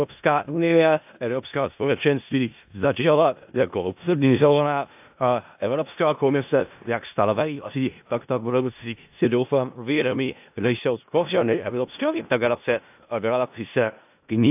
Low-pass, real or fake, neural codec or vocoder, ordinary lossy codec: 3.6 kHz; fake; codec, 16 kHz in and 24 kHz out, 0.4 kbps, LongCat-Audio-Codec, four codebook decoder; AAC, 32 kbps